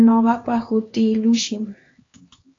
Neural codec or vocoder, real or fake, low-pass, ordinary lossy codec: codec, 16 kHz, 2 kbps, X-Codec, HuBERT features, trained on LibriSpeech; fake; 7.2 kHz; AAC, 32 kbps